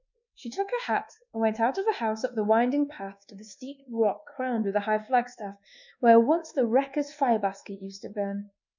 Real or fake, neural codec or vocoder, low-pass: fake; codec, 24 kHz, 1.2 kbps, DualCodec; 7.2 kHz